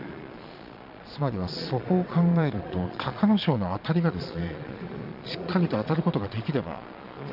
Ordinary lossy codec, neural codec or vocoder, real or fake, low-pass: none; vocoder, 22.05 kHz, 80 mel bands, Vocos; fake; 5.4 kHz